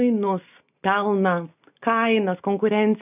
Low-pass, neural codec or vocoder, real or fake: 3.6 kHz; none; real